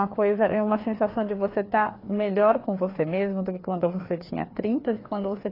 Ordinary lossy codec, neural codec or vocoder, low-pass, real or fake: AAC, 24 kbps; codec, 16 kHz, 2 kbps, FreqCodec, larger model; 5.4 kHz; fake